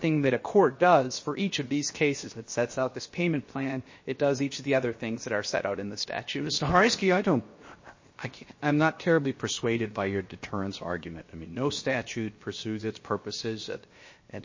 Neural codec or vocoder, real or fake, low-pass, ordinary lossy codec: codec, 16 kHz, 0.7 kbps, FocalCodec; fake; 7.2 kHz; MP3, 32 kbps